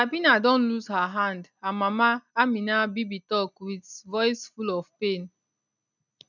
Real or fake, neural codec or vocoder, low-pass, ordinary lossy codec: real; none; 7.2 kHz; none